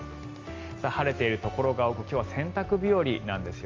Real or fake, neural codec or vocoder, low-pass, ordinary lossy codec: real; none; 7.2 kHz; Opus, 32 kbps